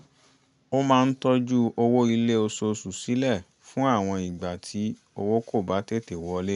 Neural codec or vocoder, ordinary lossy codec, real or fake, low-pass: none; none; real; 10.8 kHz